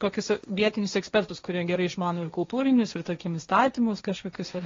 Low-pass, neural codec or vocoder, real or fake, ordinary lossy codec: 7.2 kHz; codec, 16 kHz, 1.1 kbps, Voila-Tokenizer; fake; AAC, 32 kbps